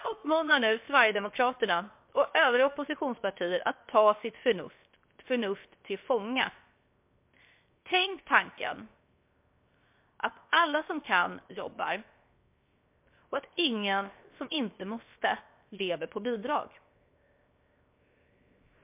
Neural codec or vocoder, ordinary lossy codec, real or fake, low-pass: codec, 16 kHz, 0.7 kbps, FocalCodec; MP3, 32 kbps; fake; 3.6 kHz